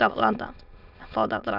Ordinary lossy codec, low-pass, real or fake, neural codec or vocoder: none; 5.4 kHz; fake; autoencoder, 22.05 kHz, a latent of 192 numbers a frame, VITS, trained on many speakers